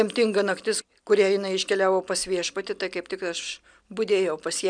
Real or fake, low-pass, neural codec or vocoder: real; 9.9 kHz; none